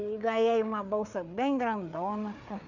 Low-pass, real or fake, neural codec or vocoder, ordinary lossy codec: 7.2 kHz; fake; codec, 16 kHz, 4 kbps, FreqCodec, larger model; none